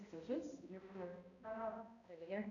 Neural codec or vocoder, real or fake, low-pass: codec, 16 kHz, 0.5 kbps, X-Codec, HuBERT features, trained on balanced general audio; fake; 7.2 kHz